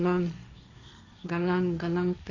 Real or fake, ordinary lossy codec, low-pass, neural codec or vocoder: fake; none; 7.2 kHz; codec, 16 kHz, 1.1 kbps, Voila-Tokenizer